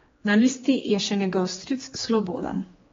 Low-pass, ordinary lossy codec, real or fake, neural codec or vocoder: 7.2 kHz; AAC, 24 kbps; fake; codec, 16 kHz, 2 kbps, X-Codec, HuBERT features, trained on general audio